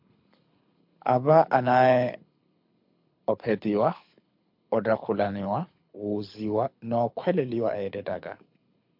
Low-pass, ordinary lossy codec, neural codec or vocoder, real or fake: 5.4 kHz; MP3, 48 kbps; codec, 24 kHz, 6 kbps, HILCodec; fake